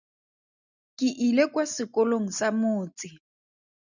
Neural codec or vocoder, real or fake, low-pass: none; real; 7.2 kHz